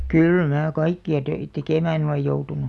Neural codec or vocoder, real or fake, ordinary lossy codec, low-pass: none; real; none; none